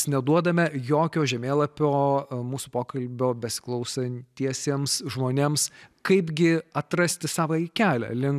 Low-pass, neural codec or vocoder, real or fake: 14.4 kHz; none; real